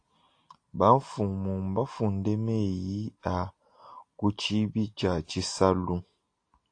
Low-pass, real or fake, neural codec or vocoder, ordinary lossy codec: 9.9 kHz; real; none; AAC, 48 kbps